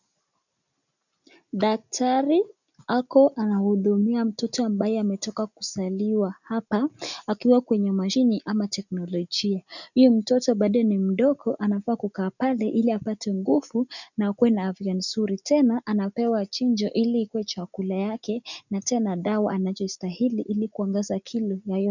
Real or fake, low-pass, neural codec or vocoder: real; 7.2 kHz; none